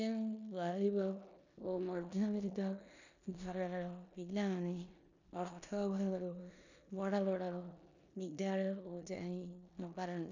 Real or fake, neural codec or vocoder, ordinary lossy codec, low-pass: fake; codec, 16 kHz in and 24 kHz out, 0.9 kbps, LongCat-Audio-Codec, four codebook decoder; none; 7.2 kHz